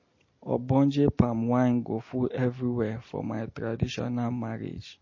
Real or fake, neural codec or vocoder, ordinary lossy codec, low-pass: real; none; MP3, 32 kbps; 7.2 kHz